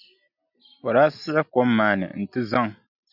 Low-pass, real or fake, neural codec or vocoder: 5.4 kHz; real; none